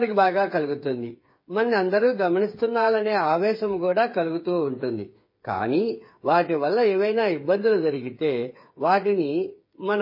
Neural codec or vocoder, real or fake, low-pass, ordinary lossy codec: codec, 16 kHz, 8 kbps, FreqCodec, smaller model; fake; 5.4 kHz; MP3, 24 kbps